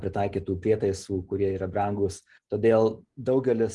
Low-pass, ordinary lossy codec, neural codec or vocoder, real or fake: 10.8 kHz; Opus, 24 kbps; none; real